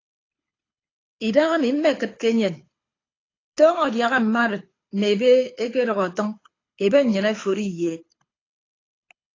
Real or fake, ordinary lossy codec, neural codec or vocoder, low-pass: fake; AAC, 32 kbps; codec, 24 kHz, 6 kbps, HILCodec; 7.2 kHz